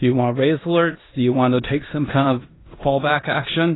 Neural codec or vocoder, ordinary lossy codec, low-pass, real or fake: codec, 16 kHz in and 24 kHz out, 0.9 kbps, LongCat-Audio-Codec, four codebook decoder; AAC, 16 kbps; 7.2 kHz; fake